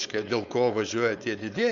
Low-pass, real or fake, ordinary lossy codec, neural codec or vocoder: 7.2 kHz; fake; MP3, 64 kbps; codec, 16 kHz, 4.8 kbps, FACodec